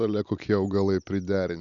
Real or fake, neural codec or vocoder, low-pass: real; none; 7.2 kHz